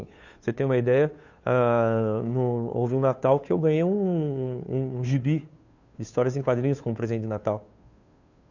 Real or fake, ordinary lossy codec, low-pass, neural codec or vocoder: fake; none; 7.2 kHz; codec, 16 kHz, 2 kbps, FunCodec, trained on Chinese and English, 25 frames a second